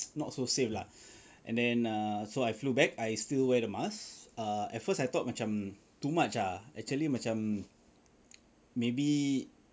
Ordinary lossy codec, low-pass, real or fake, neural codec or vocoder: none; none; real; none